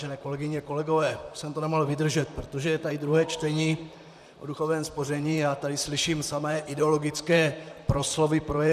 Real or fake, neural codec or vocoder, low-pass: fake; vocoder, 44.1 kHz, 128 mel bands, Pupu-Vocoder; 14.4 kHz